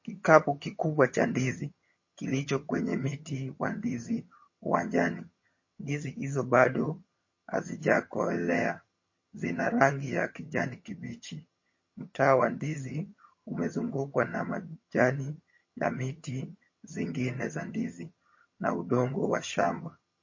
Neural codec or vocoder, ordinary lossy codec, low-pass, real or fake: vocoder, 22.05 kHz, 80 mel bands, HiFi-GAN; MP3, 32 kbps; 7.2 kHz; fake